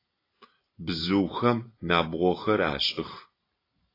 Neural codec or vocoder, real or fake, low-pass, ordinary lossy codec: none; real; 5.4 kHz; AAC, 24 kbps